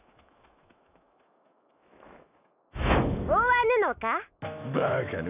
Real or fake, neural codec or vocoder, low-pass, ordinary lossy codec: fake; autoencoder, 48 kHz, 128 numbers a frame, DAC-VAE, trained on Japanese speech; 3.6 kHz; none